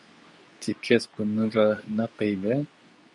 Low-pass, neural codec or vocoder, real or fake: 10.8 kHz; codec, 24 kHz, 0.9 kbps, WavTokenizer, medium speech release version 2; fake